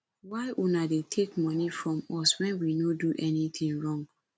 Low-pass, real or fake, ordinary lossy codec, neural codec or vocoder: none; real; none; none